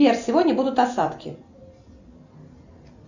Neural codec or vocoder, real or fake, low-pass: none; real; 7.2 kHz